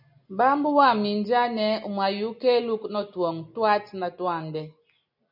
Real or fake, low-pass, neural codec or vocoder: real; 5.4 kHz; none